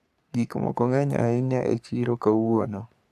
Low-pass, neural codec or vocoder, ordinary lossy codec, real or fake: 14.4 kHz; codec, 44.1 kHz, 3.4 kbps, Pupu-Codec; none; fake